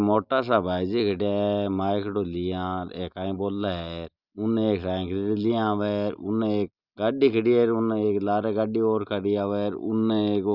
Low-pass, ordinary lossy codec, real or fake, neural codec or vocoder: 5.4 kHz; Opus, 64 kbps; real; none